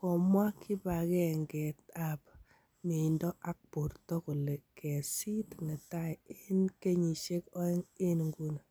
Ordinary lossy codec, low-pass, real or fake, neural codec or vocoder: none; none; real; none